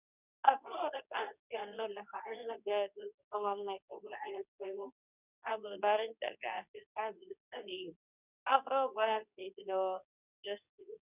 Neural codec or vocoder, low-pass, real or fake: codec, 24 kHz, 0.9 kbps, WavTokenizer, medium speech release version 2; 3.6 kHz; fake